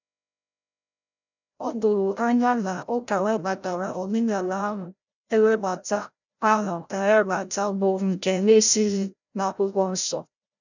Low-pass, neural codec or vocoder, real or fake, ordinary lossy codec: 7.2 kHz; codec, 16 kHz, 0.5 kbps, FreqCodec, larger model; fake; none